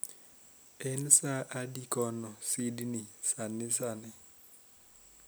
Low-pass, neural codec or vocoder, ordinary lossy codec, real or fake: none; none; none; real